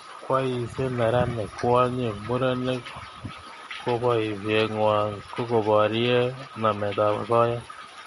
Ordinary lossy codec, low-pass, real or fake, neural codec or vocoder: MP3, 48 kbps; 19.8 kHz; real; none